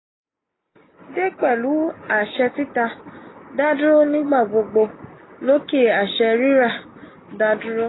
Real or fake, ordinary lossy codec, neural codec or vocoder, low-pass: real; AAC, 16 kbps; none; 7.2 kHz